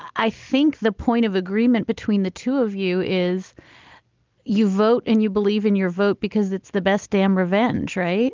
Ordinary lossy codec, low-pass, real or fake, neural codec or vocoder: Opus, 32 kbps; 7.2 kHz; real; none